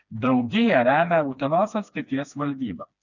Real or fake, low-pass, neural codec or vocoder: fake; 7.2 kHz; codec, 16 kHz, 2 kbps, FreqCodec, smaller model